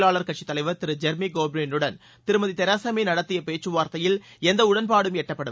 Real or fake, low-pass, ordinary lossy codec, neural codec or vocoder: real; none; none; none